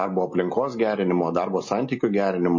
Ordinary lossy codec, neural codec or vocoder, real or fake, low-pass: MP3, 32 kbps; none; real; 7.2 kHz